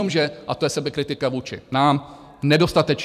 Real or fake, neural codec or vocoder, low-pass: fake; vocoder, 44.1 kHz, 128 mel bands every 256 samples, BigVGAN v2; 14.4 kHz